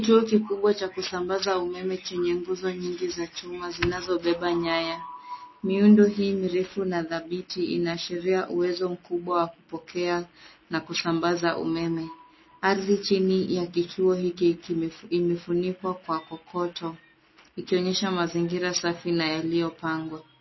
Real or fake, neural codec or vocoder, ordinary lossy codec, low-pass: real; none; MP3, 24 kbps; 7.2 kHz